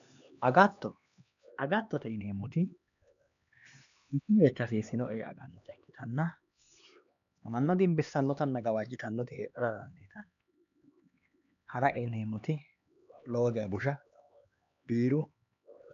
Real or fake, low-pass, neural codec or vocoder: fake; 7.2 kHz; codec, 16 kHz, 2 kbps, X-Codec, HuBERT features, trained on LibriSpeech